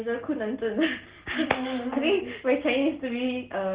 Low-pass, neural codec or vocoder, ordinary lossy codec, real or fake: 3.6 kHz; none; Opus, 16 kbps; real